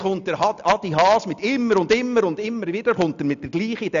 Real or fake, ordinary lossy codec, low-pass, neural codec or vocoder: real; none; 7.2 kHz; none